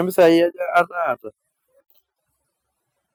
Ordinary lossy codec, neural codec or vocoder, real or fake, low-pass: none; none; real; none